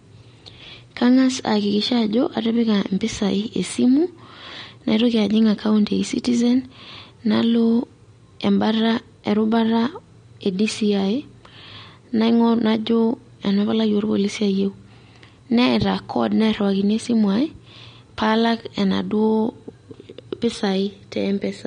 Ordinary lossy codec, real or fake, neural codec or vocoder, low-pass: MP3, 48 kbps; real; none; 9.9 kHz